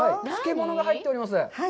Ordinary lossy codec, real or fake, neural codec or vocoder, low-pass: none; real; none; none